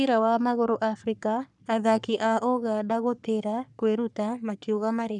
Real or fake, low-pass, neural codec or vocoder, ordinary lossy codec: fake; 10.8 kHz; codec, 44.1 kHz, 3.4 kbps, Pupu-Codec; none